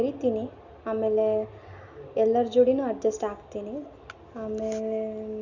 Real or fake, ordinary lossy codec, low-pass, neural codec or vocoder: real; none; 7.2 kHz; none